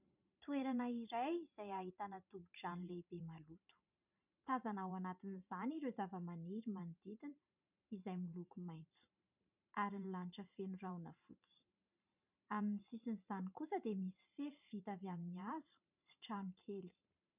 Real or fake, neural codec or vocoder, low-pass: fake; vocoder, 44.1 kHz, 128 mel bands every 512 samples, BigVGAN v2; 3.6 kHz